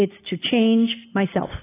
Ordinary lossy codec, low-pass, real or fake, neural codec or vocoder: AAC, 16 kbps; 3.6 kHz; real; none